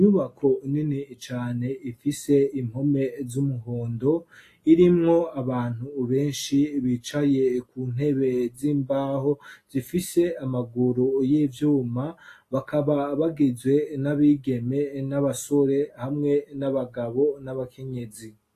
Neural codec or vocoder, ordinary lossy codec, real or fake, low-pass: none; AAC, 48 kbps; real; 14.4 kHz